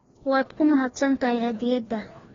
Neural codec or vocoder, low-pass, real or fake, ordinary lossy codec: codec, 16 kHz, 1 kbps, FreqCodec, larger model; 7.2 kHz; fake; AAC, 24 kbps